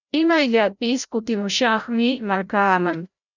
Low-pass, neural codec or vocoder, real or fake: 7.2 kHz; codec, 16 kHz, 0.5 kbps, FreqCodec, larger model; fake